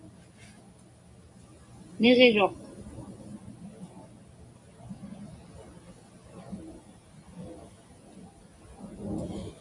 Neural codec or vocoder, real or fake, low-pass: none; real; 10.8 kHz